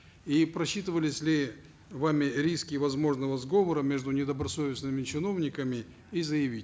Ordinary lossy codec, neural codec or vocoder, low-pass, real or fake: none; none; none; real